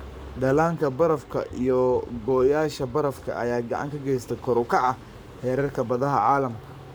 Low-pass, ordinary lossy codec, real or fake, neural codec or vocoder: none; none; fake; codec, 44.1 kHz, 7.8 kbps, Pupu-Codec